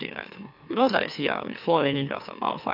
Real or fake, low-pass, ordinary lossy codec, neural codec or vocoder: fake; 5.4 kHz; none; autoencoder, 44.1 kHz, a latent of 192 numbers a frame, MeloTTS